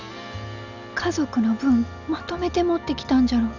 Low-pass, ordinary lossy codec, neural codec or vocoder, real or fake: 7.2 kHz; none; none; real